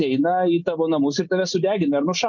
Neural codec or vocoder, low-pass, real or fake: none; 7.2 kHz; real